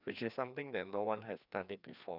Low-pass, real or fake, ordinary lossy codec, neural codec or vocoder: 5.4 kHz; fake; none; codec, 16 kHz, 2 kbps, FreqCodec, larger model